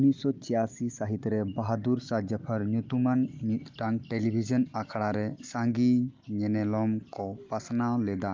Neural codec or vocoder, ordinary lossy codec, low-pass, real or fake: none; Opus, 24 kbps; 7.2 kHz; real